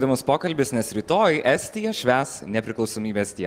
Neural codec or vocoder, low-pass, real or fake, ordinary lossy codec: none; 14.4 kHz; real; Opus, 24 kbps